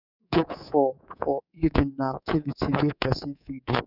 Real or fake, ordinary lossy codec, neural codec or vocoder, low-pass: fake; none; codec, 24 kHz, 3.1 kbps, DualCodec; 5.4 kHz